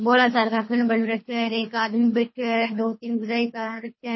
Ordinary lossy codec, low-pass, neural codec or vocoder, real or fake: MP3, 24 kbps; 7.2 kHz; codec, 24 kHz, 3 kbps, HILCodec; fake